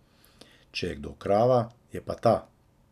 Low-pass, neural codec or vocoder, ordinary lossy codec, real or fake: 14.4 kHz; none; none; real